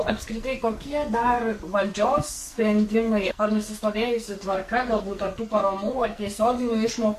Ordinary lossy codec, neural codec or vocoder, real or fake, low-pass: MP3, 64 kbps; codec, 44.1 kHz, 2.6 kbps, SNAC; fake; 14.4 kHz